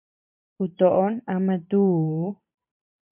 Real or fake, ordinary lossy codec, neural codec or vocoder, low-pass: fake; MP3, 32 kbps; vocoder, 44.1 kHz, 128 mel bands every 512 samples, BigVGAN v2; 3.6 kHz